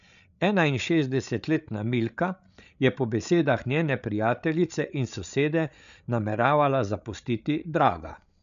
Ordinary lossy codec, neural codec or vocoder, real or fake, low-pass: none; codec, 16 kHz, 8 kbps, FreqCodec, larger model; fake; 7.2 kHz